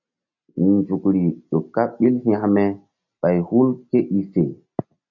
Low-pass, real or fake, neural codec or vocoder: 7.2 kHz; real; none